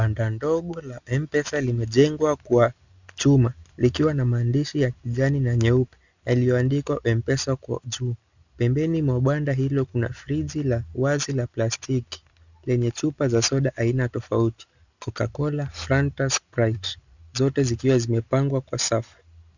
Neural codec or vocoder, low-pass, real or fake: none; 7.2 kHz; real